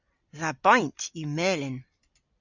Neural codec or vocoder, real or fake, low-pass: vocoder, 44.1 kHz, 128 mel bands every 256 samples, BigVGAN v2; fake; 7.2 kHz